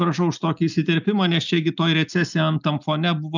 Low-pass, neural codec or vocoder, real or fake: 7.2 kHz; none; real